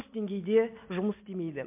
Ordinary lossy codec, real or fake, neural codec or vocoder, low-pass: MP3, 32 kbps; real; none; 3.6 kHz